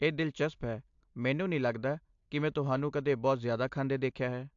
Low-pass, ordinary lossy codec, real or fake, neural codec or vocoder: 7.2 kHz; AAC, 64 kbps; real; none